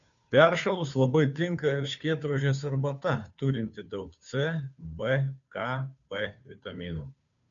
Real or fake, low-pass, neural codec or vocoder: fake; 7.2 kHz; codec, 16 kHz, 2 kbps, FunCodec, trained on Chinese and English, 25 frames a second